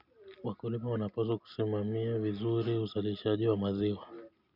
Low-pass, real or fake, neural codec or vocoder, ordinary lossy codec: 5.4 kHz; real; none; none